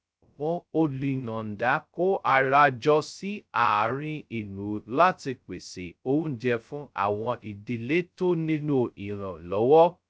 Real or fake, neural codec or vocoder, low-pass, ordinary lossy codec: fake; codec, 16 kHz, 0.2 kbps, FocalCodec; none; none